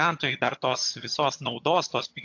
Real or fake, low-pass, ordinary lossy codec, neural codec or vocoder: fake; 7.2 kHz; AAC, 48 kbps; vocoder, 22.05 kHz, 80 mel bands, HiFi-GAN